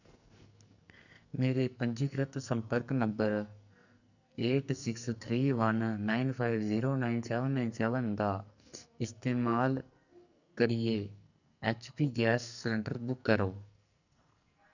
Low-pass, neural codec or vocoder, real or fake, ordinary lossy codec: 7.2 kHz; codec, 44.1 kHz, 2.6 kbps, SNAC; fake; none